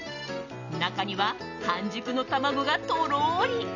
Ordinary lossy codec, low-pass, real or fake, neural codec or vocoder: none; 7.2 kHz; real; none